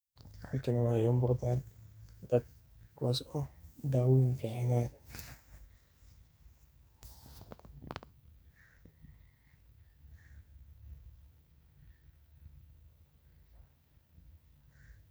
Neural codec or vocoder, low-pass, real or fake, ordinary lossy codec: codec, 44.1 kHz, 2.6 kbps, SNAC; none; fake; none